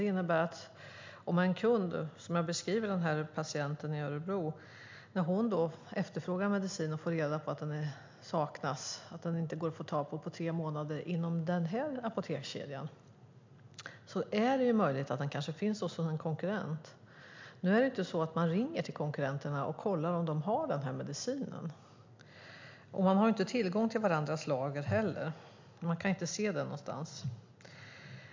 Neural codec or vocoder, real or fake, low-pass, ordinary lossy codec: none; real; 7.2 kHz; MP3, 64 kbps